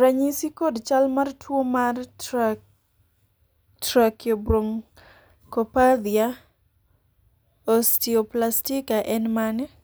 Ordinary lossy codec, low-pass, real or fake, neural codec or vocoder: none; none; real; none